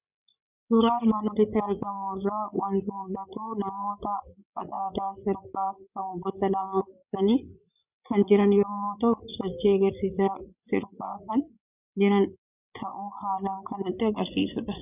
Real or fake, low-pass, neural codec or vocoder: fake; 3.6 kHz; codec, 16 kHz, 8 kbps, FreqCodec, larger model